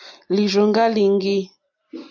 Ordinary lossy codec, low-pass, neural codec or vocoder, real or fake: AAC, 48 kbps; 7.2 kHz; none; real